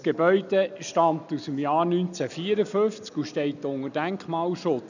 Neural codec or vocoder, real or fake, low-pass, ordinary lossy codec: none; real; 7.2 kHz; none